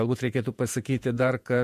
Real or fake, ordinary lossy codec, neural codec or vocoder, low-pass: fake; MP3, 64 kbps; vocoder, 48 kHz, 128 mel bands, Vocos; 14.4 kHz